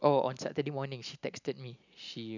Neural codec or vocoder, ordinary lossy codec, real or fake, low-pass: none; none; real; 7.2 kHz